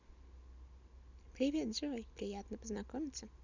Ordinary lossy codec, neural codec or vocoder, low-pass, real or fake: none; none; 7.2 kHz; real